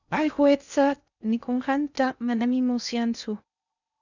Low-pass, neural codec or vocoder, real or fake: 7.2 kHz; codec, 16 kHz in and 24 kHz out, 0.6 kbps, FocalCodec, streaming, 2048 codes; fake